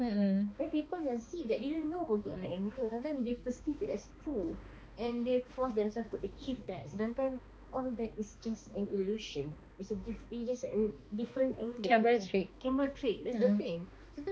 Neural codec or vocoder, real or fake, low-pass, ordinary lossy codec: codec, 16 kHz, 2 kbps, X-Codec, HuBERT features, trained on balanced general audio; fake; none; none